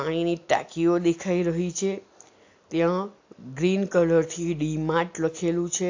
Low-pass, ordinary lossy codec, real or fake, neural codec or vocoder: 7.2 kHz; AAC, 48 kbps; real; none